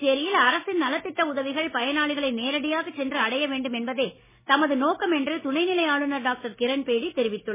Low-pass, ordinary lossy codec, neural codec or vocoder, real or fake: 3.6 kHz; MP3, 16 kbps; none; real